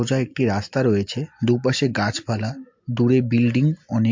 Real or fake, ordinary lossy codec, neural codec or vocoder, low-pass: real; MP3, 48 kbps; none; 7.2 kHz